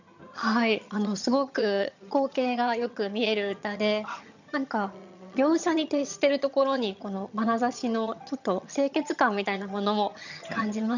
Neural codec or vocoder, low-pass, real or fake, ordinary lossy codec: vocoder, 22.05 kHz, 80 mel bands, HiFi-GAN; 7.2 kHz; fake; none